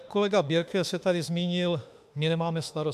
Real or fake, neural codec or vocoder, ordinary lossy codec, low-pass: fake; autoencoder, 48 kHz, 32 numbers a frame, DAC-VAE, trained on Japanese speech; AAC, 96 kbps; 14.4 kHz